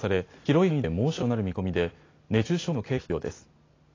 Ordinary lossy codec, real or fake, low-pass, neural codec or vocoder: AAC, 32 kbps; real; 7.2 kHz; none